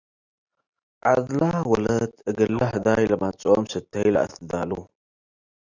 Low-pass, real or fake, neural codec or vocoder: 7.2 kHz; real; none